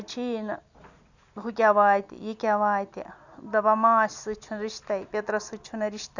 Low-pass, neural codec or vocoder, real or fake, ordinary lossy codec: 7.2 kHz; none; real; none